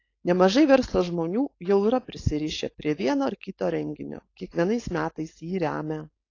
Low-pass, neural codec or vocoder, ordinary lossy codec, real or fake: 7.2 kHz; codec, 16 kHz, 4.8 kbps, FACodec; AAC, 32 kbps; fake